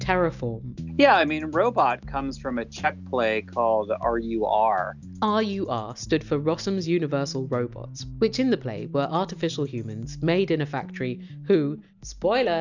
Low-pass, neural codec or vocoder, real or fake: 7.2 kHz; none; real